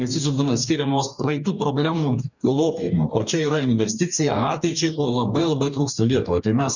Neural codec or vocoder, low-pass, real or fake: codec, 44.1 kHz, 2.6 kbps, DAC; 7.2 kHz; fake